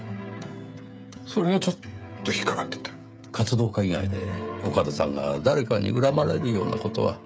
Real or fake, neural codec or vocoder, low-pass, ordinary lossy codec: fake; codec, 16 kHz, 16 kbps, FreqCodec, smaller model; none; none